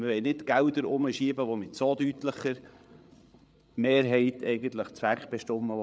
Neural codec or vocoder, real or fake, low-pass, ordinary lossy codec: codec, 16 kHz, 8 kbps, FreqCodec, larger model; fake; none; none